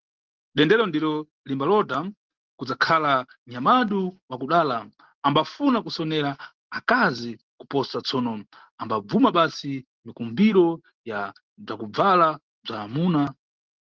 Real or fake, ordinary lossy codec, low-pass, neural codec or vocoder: real; Opus, 16 kbps; 7.2 kHz; none